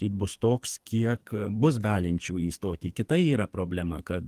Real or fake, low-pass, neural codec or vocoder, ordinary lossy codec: fake; 14.4 kHz; codec, 44.1 kHz, 2.6 kbps, SNAC; Opus, 24 kbps